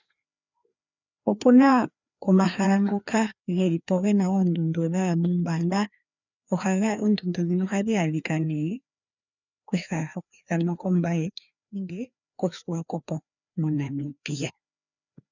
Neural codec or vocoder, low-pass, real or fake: codec, 16 kHz, 2 kbps, FreqCodec, larger model; 7.2 kHz; fake